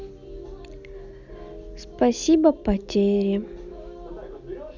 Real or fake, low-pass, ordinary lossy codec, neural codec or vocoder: real; 7.2 kHz; none; none